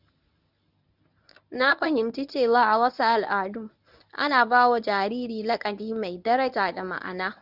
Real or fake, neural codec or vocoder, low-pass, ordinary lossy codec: fake; codec, 24 kHz, 0.9 kbps, WavTokenizer, medium speech release version 1; 5.4 kHz; none